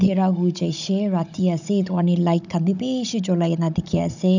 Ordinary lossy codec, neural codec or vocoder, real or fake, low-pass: none; codec, 16 kHz, 16 kbps, FunCodec, trained on Chinese and English, 50 frames a second; fake; 7.2 kHz